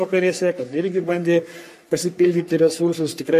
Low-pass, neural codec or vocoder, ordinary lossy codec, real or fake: 14.4 kHz; codec, 44.1 kHz, 3.4 kbps, Pupu-Codec; MP3, 64 kbps; fake